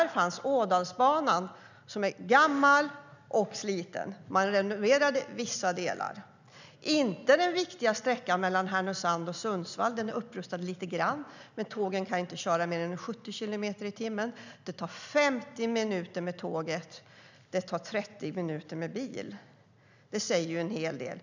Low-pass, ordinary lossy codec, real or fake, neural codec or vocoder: 7.2 kHz; none; real; none